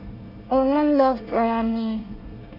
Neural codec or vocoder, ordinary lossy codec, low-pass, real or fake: codec, 24 kHz, 1 kbps, SNAC; none; 5.4 kHz; fake